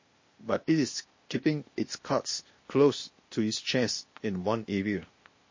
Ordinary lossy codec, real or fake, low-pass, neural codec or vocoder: MP3, 32 kbps; fake; 7.2 kHz; codec, 16 kHz, 0.8 kbps, ZipCodec